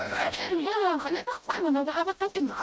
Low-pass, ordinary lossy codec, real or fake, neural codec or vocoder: none; none; fake; codec, 16 kHz, 0.5 kbps, FreqCodec, smaller model